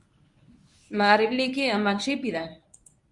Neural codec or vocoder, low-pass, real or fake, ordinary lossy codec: codec, 24 kHz, 0.9 kbps, WavTokenizer, medium speech release version 1; 10.8 kHz; fake; Opus, 64 kbps